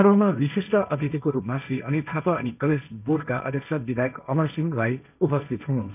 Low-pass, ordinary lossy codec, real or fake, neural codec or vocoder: 3.6 kHz; none; fake; codec, 16 kHz, 1.1 kbps, Voila-Tokenizer